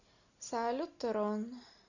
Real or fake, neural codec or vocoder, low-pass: real; none; 7.2 kHz